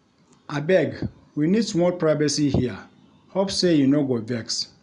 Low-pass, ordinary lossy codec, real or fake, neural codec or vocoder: 10.8 kHz; none; real; none